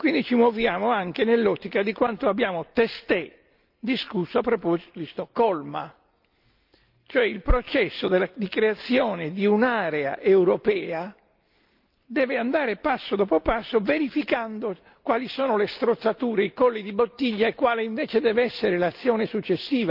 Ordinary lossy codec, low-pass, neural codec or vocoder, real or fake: Opus, 24 kbps; 5.4 kHz; none; real